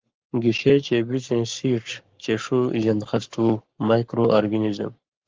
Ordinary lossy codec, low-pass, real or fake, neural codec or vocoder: Opus, 32 kbps; 7.2 kHz; fake; codec, 44.1 kHz, 7.8 kbps, Pupu-Codec